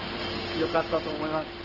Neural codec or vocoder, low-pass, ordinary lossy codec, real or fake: none; 5.4 kHz; Opus, 16 kbps; real